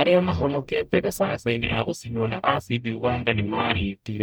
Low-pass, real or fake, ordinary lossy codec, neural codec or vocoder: none; fake; none; codec, 44.1 kHz, 0.9 kbps, DAC